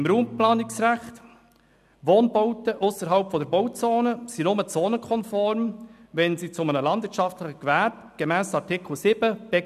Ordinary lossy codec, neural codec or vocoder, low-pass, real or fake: none; none; 14.4 kHz; real